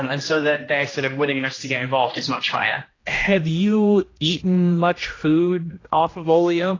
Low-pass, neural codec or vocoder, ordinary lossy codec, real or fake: 7.2 kHz; codec, 16 kHz, 1 kbps, X-Codec, HuBERT features, trained on general audio; AAC, 32 kbps; fake